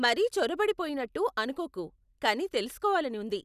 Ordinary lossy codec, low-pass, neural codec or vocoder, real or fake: none; 14.4 kHz; none; real